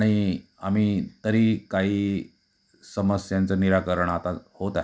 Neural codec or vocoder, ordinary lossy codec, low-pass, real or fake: none; none; none; real